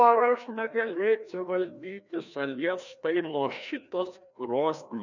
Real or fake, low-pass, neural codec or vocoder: fake; 7.2 kHz; codec, 16 kHz, 1 kbps, FreqCodec, larger model